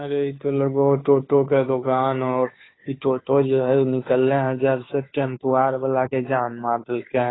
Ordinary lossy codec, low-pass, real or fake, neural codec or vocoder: AAC, 16 kbps; 7.2 kHz; fake; codec, 16 kHz, 2 kbps, FunCodec, trained on Chinese and English, 25 frames a second